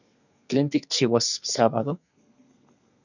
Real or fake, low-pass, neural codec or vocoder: fake; 7.2 kHz; codec, 32 kHz, 1.9 kbps, SNAC